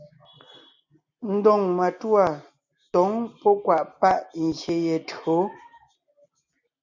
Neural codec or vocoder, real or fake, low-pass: none; real; 7.2 kHz